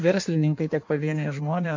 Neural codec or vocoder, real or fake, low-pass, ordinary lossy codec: codec, 16 kHz in and 24 kHz out, 1.1 kbps, FireRedTTS-2 codec; fake; 7.2 kHz; MP3, 48 kbps